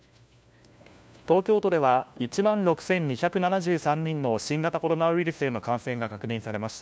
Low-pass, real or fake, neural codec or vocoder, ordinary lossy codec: none; fake; codec, 16 kHz, 1 kbps, FunCodec, trained on LibriTTS, 50 frames a second; none